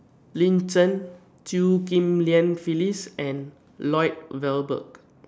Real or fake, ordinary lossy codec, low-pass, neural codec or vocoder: real; none; none; none